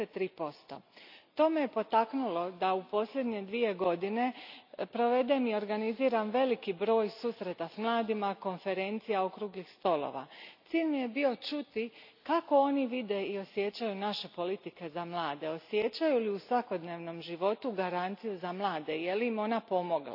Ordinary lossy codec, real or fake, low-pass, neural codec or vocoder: AAC, 48 kbps; real; 5.4 kHz; none